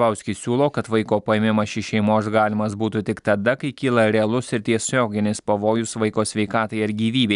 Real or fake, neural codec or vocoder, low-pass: real; none; 10.8 kHz